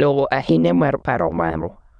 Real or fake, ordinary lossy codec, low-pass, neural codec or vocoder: fake; none; 9.9 kHz; autoencoder, 22.05 kHz, a latent of 192 numbers a frame, VITS, trained on many speakers